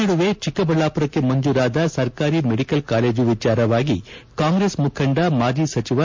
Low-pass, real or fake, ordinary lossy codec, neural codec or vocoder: 7.2 kHz; real; MP3, 48 kbps; none